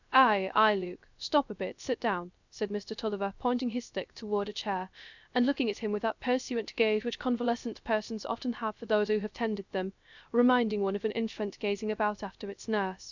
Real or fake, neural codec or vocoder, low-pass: fake; codec, 16 kHz, 0.3 kbps, FocalCodec; 7.2 kHz